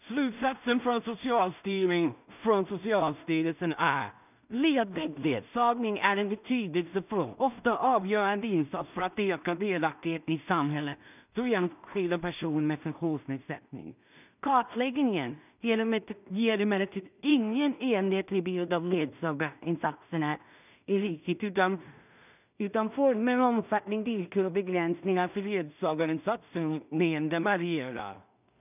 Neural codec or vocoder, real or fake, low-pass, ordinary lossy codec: codec, 16 kHz in and 24 kHz out, 0.4 kbps, LongCat-Audio-Codec, two codebook decoder; fake; 3.6 kHz; none